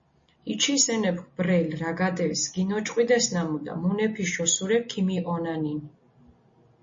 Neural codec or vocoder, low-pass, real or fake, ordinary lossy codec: none; 7.2 kHz; real; MP3, 32 kbps